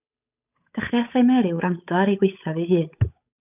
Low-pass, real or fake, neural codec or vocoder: 3.6 kHz; fake; codec, 16 kHz, 8 kbps, FunCodec, trained on Chinese and English, 25 frames a second